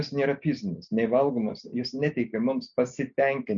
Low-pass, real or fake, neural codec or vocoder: 7.2 kHz; real; none